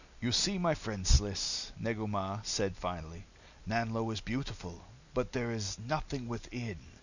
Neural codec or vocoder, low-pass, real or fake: none; 7.2 kHz; real